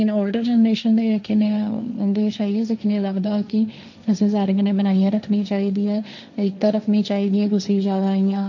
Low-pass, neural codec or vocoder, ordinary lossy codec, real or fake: 7.2 kHz; codec, 16 kHz, 1.1 kbps, Voila-Tokenizer; none; fake